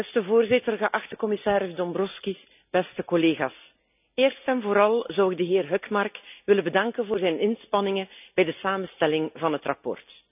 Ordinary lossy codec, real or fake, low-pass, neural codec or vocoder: none; real; 3.6 kHz; none